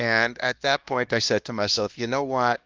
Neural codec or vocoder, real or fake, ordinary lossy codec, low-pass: codec, 16 kHz, 1 kbps, X-Codec, HuBERT features, trained on LibriSpeech; fake; Opus, 32 kbps; 7.2 kHz